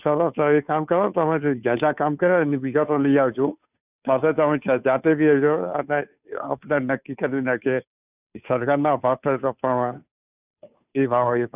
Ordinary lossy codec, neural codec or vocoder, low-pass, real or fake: none; codec, 16 kHz, 2 kbps, FunCodec, trained on Chinese and English, 25 frames a second; 3.6 kHz; fake